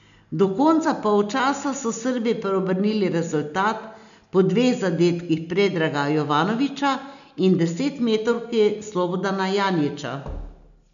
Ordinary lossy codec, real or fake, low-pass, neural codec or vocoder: none; real; 7.2 kHz; none